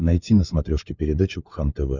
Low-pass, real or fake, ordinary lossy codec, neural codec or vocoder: 7.2 kHz; fake; Opus, 64 kbps; codec, 16 kHz, 16 kbps, FunCodec, trained on LibriTTS, 50 frames a second